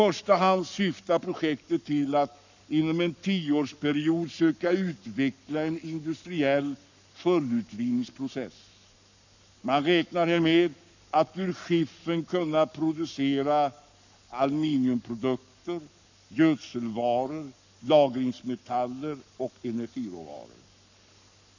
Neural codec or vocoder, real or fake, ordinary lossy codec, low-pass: codec, 44.1 kHz, 7.8 kbps, Pupu-Codec; fake; none; 7.2 kHz